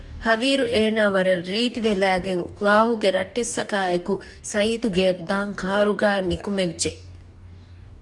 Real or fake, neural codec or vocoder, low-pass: fake; codec, 44.1 kHz, 2.6 kbps, DAC; 10.8 kHz